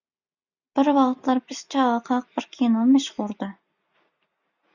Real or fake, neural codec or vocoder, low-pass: real; none; 7.2 kHz